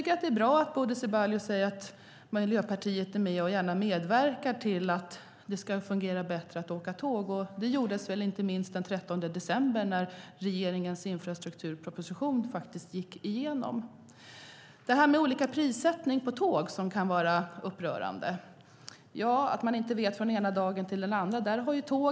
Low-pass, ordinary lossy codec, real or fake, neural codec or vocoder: none; none; real; none